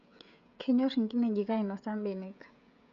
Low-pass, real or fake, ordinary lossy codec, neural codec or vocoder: 7.2 kHz; fake; none; codec, 16 kHz, 16 kbps, FreqCodec, smaller model